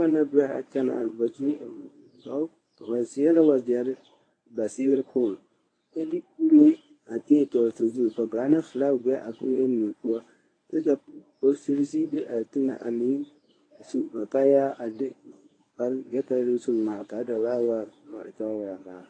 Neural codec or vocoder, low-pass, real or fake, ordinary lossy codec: codec, 24 kHz, 0.9 kbps, WavTokenizer, medium speech release version 1; 9.9 kHz; fake; AAC, 32 kbps